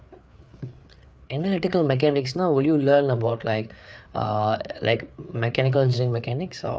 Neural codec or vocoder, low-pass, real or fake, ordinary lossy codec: codec, 16 kHz, 4 kbps, FreqCodec, larger model; none; fake; none